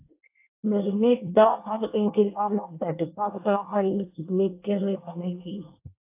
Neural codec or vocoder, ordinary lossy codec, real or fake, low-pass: codec, 24 kHz, 1 kbps, SNAC; AAC, 24 kbps; fake; 3.6 kHz